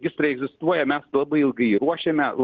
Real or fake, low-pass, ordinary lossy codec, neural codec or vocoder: real; 7.2 kHz; Opus, 16 kbps; none